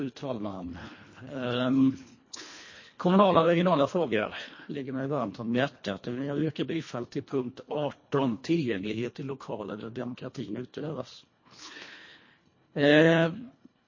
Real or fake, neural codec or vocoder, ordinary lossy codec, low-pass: fake; codec, 24 kHz, 1.5 kbps, HILCodec; MP3, 32 kbps; 7.2 kHz